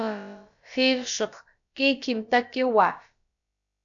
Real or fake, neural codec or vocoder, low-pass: fake; codec, 16 kHz, about 1 kbps, DyCAST, with the encoder's durations; 7.2 kHz